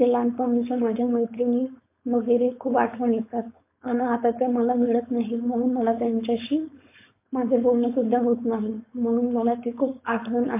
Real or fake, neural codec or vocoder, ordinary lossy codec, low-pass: fake; codec, 16 kHz, 4.8 kbps, FACodec; MP3, 24 kbps; 3.6 kHz